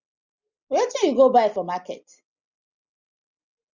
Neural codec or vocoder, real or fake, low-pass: none; real; 7.2 kHz